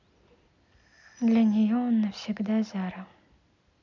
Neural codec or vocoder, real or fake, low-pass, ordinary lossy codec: none; real; 7.2 kHz; none